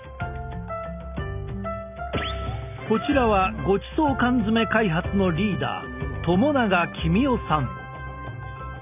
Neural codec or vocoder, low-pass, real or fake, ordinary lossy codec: none; 3.6 kHz; real; none